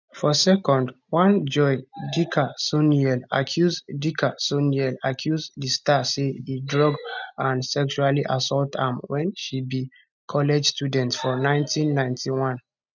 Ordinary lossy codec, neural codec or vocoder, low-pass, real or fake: none; none; 7.2 kHz; real